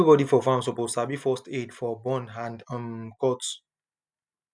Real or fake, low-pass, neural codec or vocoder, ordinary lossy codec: real; none; none; none